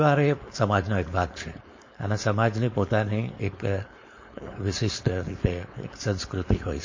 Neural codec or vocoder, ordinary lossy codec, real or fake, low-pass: codec, 16 kHz, 4.8 kbps, FACodec; MP3, 32 kbps; fake; 7.2 kHz